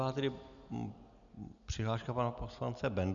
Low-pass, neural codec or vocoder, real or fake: 7.2 kHz; none; real